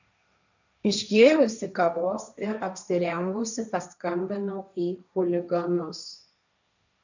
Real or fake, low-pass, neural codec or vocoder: fake; 7.2 kHz; codec, 16 kHz, 1.1 kbps, Voila-Tokenizer